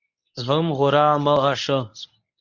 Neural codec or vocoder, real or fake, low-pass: codec, 24 kHz, 0.9 kbps, WavTokenizer, medium speech release version 1; fake; 7.2 kHz